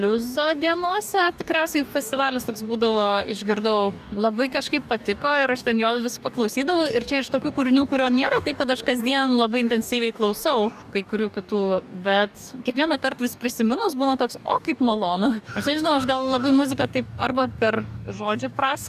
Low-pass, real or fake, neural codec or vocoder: 14.4 kHz; fake; codec, 44.1 kHz, 2.6 kbps, DAC